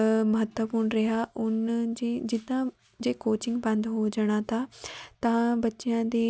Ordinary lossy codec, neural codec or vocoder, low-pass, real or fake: none; none; none; real